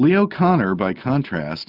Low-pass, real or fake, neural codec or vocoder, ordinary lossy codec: 5.4 kHz; real; none; Opus, 24 kbps